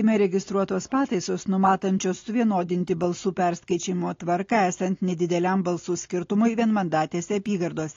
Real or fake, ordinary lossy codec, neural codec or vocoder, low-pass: real; AAC, 32 kbps; none; 7.2 kHz